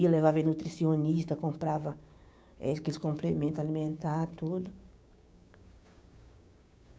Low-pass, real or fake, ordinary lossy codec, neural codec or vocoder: none; fake; none; codec, 16 kHz, 6 kbps, DAC